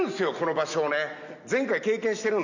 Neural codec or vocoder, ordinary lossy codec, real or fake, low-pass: none; none; real; 7.2 kHz